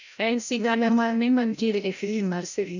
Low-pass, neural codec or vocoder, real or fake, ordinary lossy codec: 7.2 kHz; codec, 16 kHz, 0.5 kbps, FreqCodec, larger model; fake; none